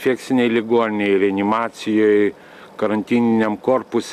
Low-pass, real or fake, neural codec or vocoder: 14.4 kHz; real; none